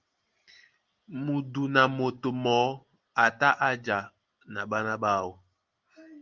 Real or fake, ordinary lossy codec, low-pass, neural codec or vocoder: real; Opus, 24 kbps; 7.2 kHz; none